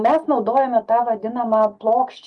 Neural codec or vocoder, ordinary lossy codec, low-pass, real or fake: none; Opus, 32 kbps; 10.8 kHz; real